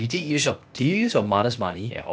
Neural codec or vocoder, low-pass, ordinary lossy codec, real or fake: codec, 16 kHz, 0.8 kbps, ZipCodec; none; none; fake